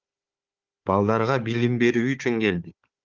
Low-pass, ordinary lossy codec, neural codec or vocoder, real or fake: 7.2 kHz; Opus, 24 kbps; codec, 16 kHz, 4 kbps, FunCodec, trained on Chinese and English, 50 frames a second; fake